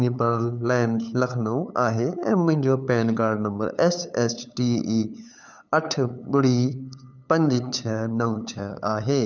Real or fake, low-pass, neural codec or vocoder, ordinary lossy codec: fake; 7.2 kHz; codec, 16 kHz, 8 kbps, FunCodec, trained on LibriTTS, 25 frames a second; none